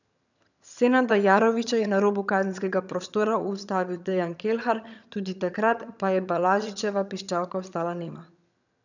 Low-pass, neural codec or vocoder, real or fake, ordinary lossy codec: 7.2 kHz; vocoder, 22.05 kHz, 80 mel bands, HiFi-GAN; fake; none